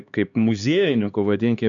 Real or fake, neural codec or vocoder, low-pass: fake; codec, 16 kHz, 2 kbps, X-Codec, HuBERT features, trained on LibriSpeech; 7.2 kHz